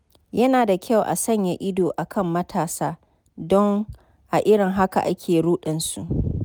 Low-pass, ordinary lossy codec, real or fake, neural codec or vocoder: none; none; real; none